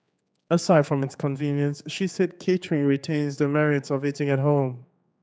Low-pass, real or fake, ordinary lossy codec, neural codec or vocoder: none; fake; none; codec, 16 kHz, 4 kbps, X-Codec, HuBERT features, trained on general audio